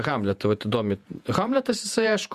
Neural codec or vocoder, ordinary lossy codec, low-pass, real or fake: vocoder, 48 kHz, 128 mel bands, Vocos; Opus, 64 kbps; 14.4 kHz; fake